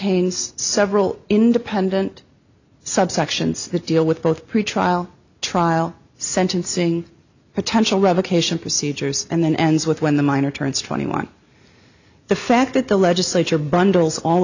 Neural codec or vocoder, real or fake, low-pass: none; real; 7.2 kHz